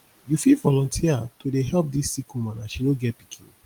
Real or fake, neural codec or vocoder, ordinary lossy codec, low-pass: real; none; Opus, 32 kbps; 19.8 kHz